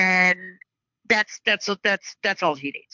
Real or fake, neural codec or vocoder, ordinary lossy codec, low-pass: fake; codec, 24 kHz, 6 kbps, HILCodec; MP3, 64 kbps; 7.2 kHz